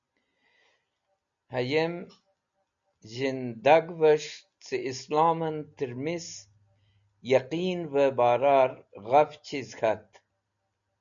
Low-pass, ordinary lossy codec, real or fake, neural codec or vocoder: 7.2 kHz; MP3, 96 kbps; real; none